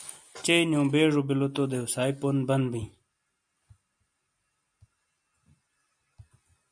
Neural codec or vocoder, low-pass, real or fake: none; 9.9 kHz; real